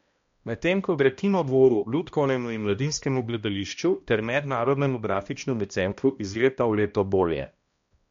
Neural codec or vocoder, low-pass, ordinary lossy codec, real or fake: codec, 16 kHz, 1 kbps, X-Codec, HuBERT features, trained on balanced general audio; 7.2 kHz; MP3, 48 kbps; fake